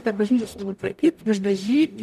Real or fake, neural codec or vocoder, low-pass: fake; codec, 44.1 kHz, 0.9 kbps, DAC; 14.4 kHz